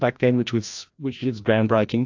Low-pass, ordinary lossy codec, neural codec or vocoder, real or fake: 7.2 kHz; AAC, 48 kbps; codec, 16 kHz, 1 kbps, FreqCodec, larger model; fake